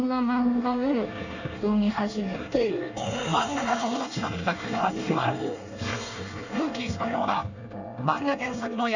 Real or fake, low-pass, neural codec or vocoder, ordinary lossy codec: fake; 7.2 kHz; codec, 24 kHz, 1 kbps, SNAC; none